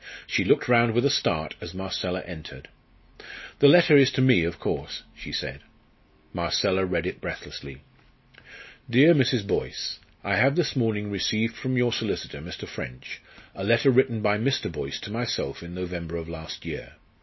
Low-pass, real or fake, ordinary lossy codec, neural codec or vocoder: 7.2 kHz; real; MP3, 24 kbps; none